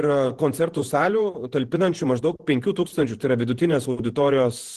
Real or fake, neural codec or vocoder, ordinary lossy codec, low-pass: fake; vocoder, 48 kHz, 128 mel bands, Vocos; Opus, 16 kbps; 14.4 kHz